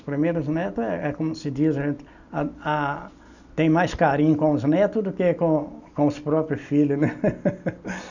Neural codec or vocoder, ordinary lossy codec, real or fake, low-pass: none; none; real; 7.2 kHz